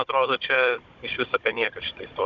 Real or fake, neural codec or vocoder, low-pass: fake; codec, 16 kHz, 16 kbps, FunCodec, trained on Chinese and English, 50 frames a second; 7.2 kHz